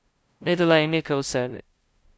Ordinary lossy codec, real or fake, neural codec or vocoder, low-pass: none; fake; codec, 16 kHz, 0.5 kbps, FunCodec, trained on LibriTTS, 25 frames a second; none